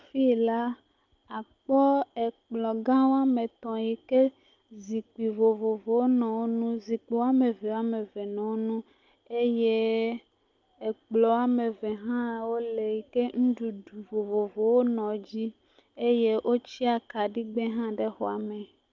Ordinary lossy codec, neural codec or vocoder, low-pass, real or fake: Opus, 24 kbps; none; 7.2 kHz; real